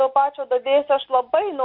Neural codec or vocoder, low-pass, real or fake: none; 5.4 kHz; real